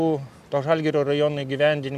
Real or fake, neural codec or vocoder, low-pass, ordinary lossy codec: real; none; 14.4 kHz; AAC, 96 kbps